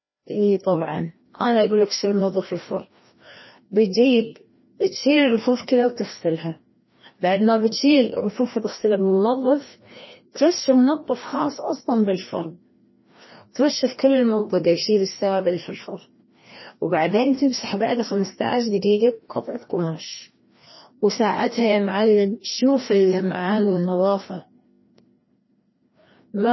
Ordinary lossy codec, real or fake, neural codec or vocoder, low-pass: MP3, 24 kbps; fake; codec, 16 kHz, 1 kbps, FreqCodec, larger model; 7.2 kHz